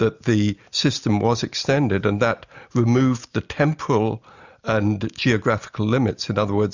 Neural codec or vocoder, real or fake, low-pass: none; real; 7.2 kHz